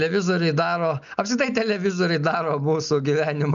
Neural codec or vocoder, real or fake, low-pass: none; real; 7.2 kHz